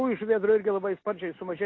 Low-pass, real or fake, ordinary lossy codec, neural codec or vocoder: 7.2 kHz; real; AAC, 32 kbps; none